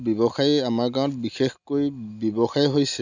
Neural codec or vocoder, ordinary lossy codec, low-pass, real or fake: none; none; 7.2 kHz; real